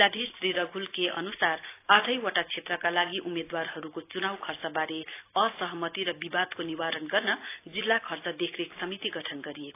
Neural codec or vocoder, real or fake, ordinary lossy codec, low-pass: none; real; AAC, 24 kbps; 3.6 kHz